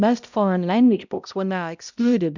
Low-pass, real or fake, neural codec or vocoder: 7.2 kHz; fake; codec, 16 kHz, 0.5 kbps, X-Codec, HuBERT features, trained on balanced general audio